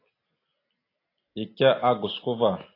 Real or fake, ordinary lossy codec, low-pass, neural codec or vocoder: real; AAC, 24 kbps; 5.4 kHz; none